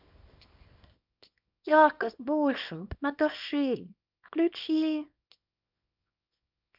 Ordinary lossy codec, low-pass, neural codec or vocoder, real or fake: none; 5.4 kHz; codec, 24 kHz, 0.9 kbps, WavTokenizer, small release; fake